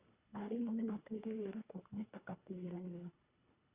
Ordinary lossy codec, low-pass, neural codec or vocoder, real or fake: Opus, 64 kbps; 3.6 kHz; codec, 24 kHz, 1.5 kbps, HILCodec; fake